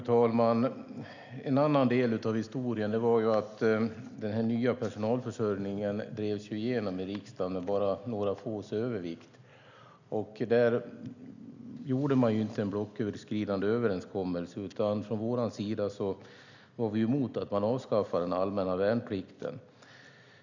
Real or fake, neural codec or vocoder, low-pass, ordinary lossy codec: real; none; 7.2 kHz; none